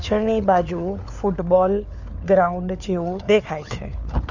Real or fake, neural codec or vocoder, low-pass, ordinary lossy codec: fake; codec, 16 kHz, 4 kbps, FunCodec, trained on LibriTTS, 50 frames a second; 7.2 kHz; Opus, 64 kbps